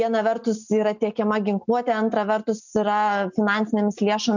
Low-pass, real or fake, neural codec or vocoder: 7.2 kHz; real; none